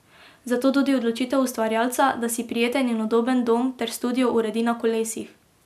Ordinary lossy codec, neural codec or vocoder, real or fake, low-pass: none; none; real; 14.4 kHz